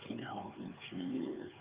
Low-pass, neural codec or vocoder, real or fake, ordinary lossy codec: 3.6 kHz; codec, 16 kHz, 4 kbps, X-Codec, HuBERT features, trained on LibriSpeech; fake; Opus, 32 kbps